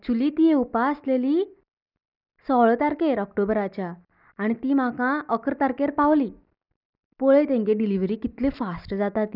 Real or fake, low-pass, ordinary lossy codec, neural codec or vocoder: real; 5.4 kHz; none; none